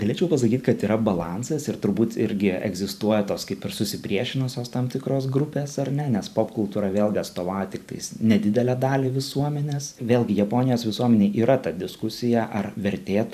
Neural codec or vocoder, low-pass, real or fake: vocoder, 44.1 kHz, 128 mel bands every 512 samples, BigVGAN v2; 14.4 kHz; fake